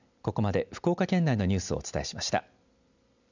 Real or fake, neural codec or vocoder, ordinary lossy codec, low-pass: real; none; none; 7.2 kHz